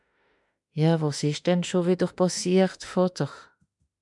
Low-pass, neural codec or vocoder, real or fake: 10.8 kHz; autoencoder, 48 kHz, 32 numbers a frame, DAC-VAE, trained on Japanese speech; fake